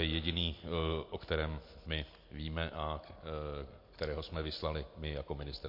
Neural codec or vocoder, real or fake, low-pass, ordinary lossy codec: none; real; 5.4 kHz; MP3, 32 kbps